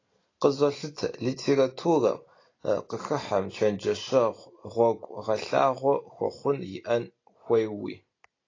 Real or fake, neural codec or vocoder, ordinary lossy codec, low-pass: real; none; AAC, 32 kbps; 7.2 kHz